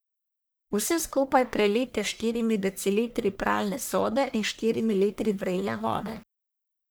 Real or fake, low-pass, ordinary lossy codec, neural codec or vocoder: fake; none; none; codec, 44.1 kHz, 1.7 kbps, Pupu-Codec